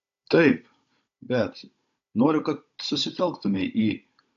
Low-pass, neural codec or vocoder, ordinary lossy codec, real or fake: 7.2 kHz; codec, 16 kHz, 16 kbps, FunCodec, trained on Chinese and English, 50 frames a second; AAC, 48 kbps; fake